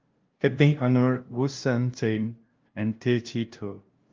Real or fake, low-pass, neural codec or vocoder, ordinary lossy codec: fake; 7.2 kHz; codec, 16 kHz, 0.5 kbps, FunCodec, trained on LibriTTS, 25 frames a second; Opus, 32 kbps